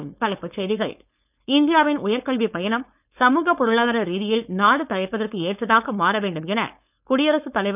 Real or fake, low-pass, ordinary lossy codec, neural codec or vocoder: fake; 3.6 kHz; none; codec, 16 kHz, 4.8 kbps, FACodec